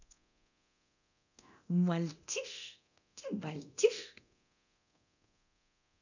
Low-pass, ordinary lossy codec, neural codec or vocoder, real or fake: 7.2 kHz; none; codec, 24 kHz, 0.9 kbps, DualCodec; fake